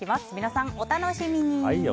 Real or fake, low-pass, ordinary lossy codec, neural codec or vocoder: real; none; none; none